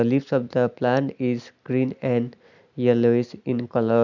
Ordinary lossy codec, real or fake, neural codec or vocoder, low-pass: none; real; none; 7.2 kHz